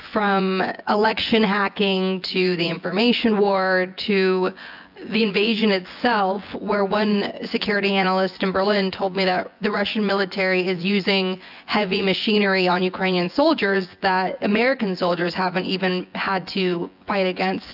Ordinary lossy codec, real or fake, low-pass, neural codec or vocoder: AAC, 48 kbps; fake; 5.4 kHz; vocoder, 24 kHz, 100 mel bands, Vocos